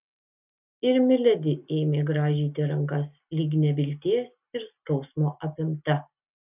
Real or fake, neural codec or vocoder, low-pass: real; none; 3.6 kHz